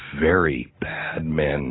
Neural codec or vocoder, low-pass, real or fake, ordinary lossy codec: none; 7.2 kHz; real; AAC, 16 kbps